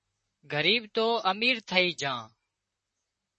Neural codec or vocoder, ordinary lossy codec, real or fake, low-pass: vocoder, 44.1 kHz, 128 mel bands, Pupu-Vocoder; MP3, 32 kbps; fake; 10.8 kHz